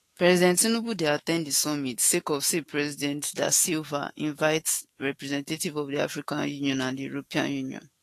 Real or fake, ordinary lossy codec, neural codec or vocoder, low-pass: fake; AAC, 48 kbps; autoencoder, 48 kHz, 128 numbers a frame, DAC-VAE, trained on Japanese speech; 14.4 kHz